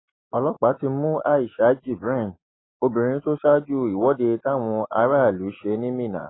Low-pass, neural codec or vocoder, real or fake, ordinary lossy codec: 7.2 kHz; none; real; AAC, 16 kbps